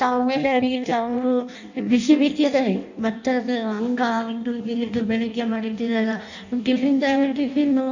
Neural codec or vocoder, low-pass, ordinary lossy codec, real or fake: codec, 16 kHz in and 24 kHz out, 0.6 kbps, FireRedTTS-2 codec; 7.2 kHz; none; fake